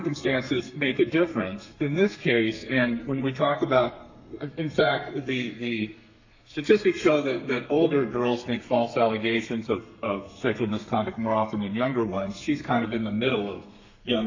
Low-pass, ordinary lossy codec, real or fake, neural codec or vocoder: 7.2 kHz; AAC, 48 kbps; fake; codec, 32 kHz, 1.9 kbps, SNAC